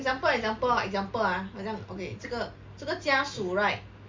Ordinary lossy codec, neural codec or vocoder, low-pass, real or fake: MP3, 64 kbps; none; 7.2 kHz; real